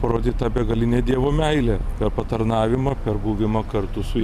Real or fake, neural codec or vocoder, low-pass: fake; vocoder, 44.1 kHz, 128 mel bands every 512 samples, BigVGAN v2; 14.4 kHz